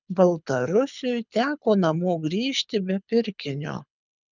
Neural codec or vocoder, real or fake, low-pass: codec, 24 kHz, 6 kbps, HILCodec; fake; 7.2 kHz